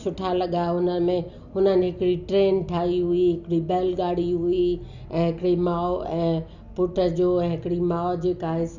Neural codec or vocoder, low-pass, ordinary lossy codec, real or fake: none; 7.2 kHz; none; real